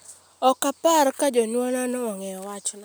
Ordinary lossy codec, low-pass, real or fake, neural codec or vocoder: none; none; real; none